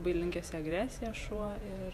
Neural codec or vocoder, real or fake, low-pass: vocoder, 48 kHz, 128 mel bands, Vocos; fake; 14.4 kHz